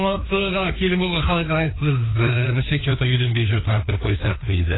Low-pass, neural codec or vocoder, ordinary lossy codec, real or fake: 7.2 kHz; codec, 16 kHz, 2 kbps, FreqCodec, larger model; AAC, 16 kbps; fake